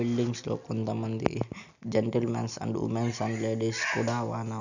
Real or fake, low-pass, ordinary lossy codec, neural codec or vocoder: real; 7.2 kHz; none; none